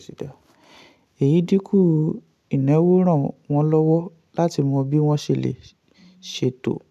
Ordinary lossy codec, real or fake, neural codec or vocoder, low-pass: none; real; none; 14.4 kHz